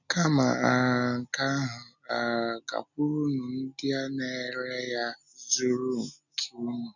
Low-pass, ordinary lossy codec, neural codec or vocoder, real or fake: 7.2 kHz; none; none; real